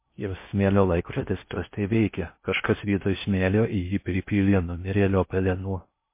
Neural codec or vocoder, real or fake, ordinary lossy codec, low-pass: codec, 16 kHz in and 24 kHz out, 0.6 kbps, FocalCodec, streaming, 2048 codes; fake; MP3, 24 kbps; 3.6 kHz